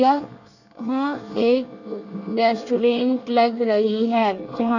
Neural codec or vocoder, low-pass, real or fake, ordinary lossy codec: codec, 24 kHz, 1 kbps, SNAC; 7.2 kHz; fake; none